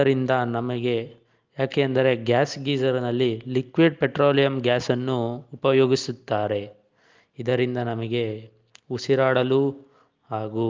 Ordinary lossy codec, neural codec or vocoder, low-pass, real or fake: Opus, 24 kbps; none; 7.2 kHz; real